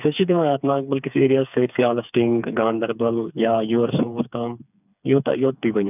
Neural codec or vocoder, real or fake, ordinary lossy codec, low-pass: codec, 16 kHz, 4 kbps, FreqCodec, smaller model; fake; none; 3.6 kHz